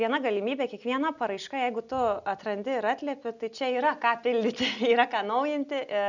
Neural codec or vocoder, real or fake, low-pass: none; real; 7.2 kHz